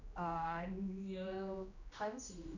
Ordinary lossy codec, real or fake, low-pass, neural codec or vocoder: none; fake; 7.2 kHz; codec, 16 kHz, 1 kbps, X-Codec, HuBERT features, trained on general audio